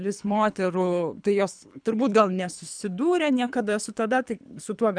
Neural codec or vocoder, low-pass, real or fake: codec, 24 kHz, 3 kbps, HILCodec; 9.9 kHz; fake